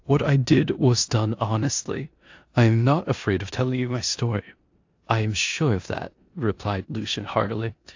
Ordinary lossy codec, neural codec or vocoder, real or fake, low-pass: AAC, 48 kbps; codec, 16 kHz in and 24 kHz out, 0.9 kbps, LongCat-Audio-Codec, four codebook decoder; fake; 7.2 kHz